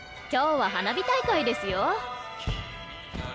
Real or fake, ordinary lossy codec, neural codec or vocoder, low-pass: real; none; none; none